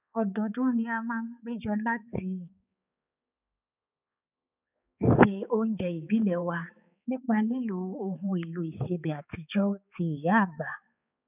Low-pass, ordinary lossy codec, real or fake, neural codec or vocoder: 3.6 kHz; none; fake; codec, 16 kHz, 4 kbps, X-Codec, HuBERT features, trained on balanced general audio